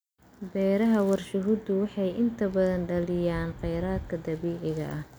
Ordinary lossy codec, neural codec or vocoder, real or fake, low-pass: none; none; real; none